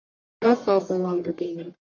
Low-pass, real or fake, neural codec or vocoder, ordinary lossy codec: 7.2 kHz; fake; codec, 44.1 kHz, 1.7 kbps, Pupu-Codec; MP3, 48 kbps